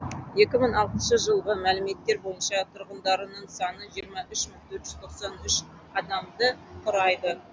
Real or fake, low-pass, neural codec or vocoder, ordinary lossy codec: real; none; none; none